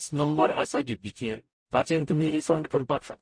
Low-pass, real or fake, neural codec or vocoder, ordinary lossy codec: 9.9 kHz; fake; codec, 44.1 kHz, 0.9 kbps, DAC; MP3, 48 kbps